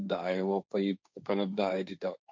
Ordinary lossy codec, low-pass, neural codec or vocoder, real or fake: none; none; codec, 16 kHz, 1.1 kbps, Voila-Tokenizer; fake